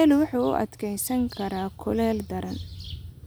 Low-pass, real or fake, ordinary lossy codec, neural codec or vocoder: none; real; none; none